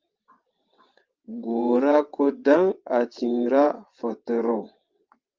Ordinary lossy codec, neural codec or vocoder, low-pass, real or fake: Opus, 24 kbps; vocoder, 22.05 kHz, 80 mel bands, WaveNeXt; 7.2 kHz; fake